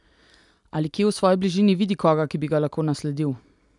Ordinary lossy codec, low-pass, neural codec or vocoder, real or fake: none; 10.8 kHz; none; real